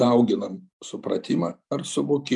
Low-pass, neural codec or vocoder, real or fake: 10.8 kHz; none; real